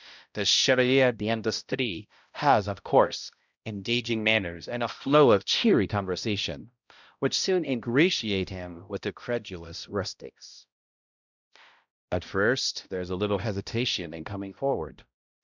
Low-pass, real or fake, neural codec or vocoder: 7.2 kHz; fake; codec, 16 kHz, 0.5 kbps, X-Codec, HuBERT features, trained on balanced general audio